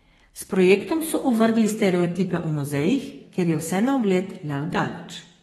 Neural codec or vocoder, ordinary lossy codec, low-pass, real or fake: codec, 32 kHz, 1.9 kbps, SNAC; AAC, 32 kbps; 14.4 kHz; fake